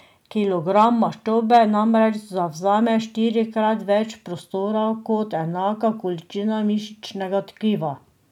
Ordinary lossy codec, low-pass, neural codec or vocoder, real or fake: none; 19.8 kHz; none; real